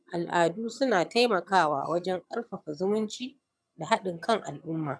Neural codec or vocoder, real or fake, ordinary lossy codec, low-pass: vocoder, 22.05 kHz, 80 mel bands, HiFi-GAN; fake; none; none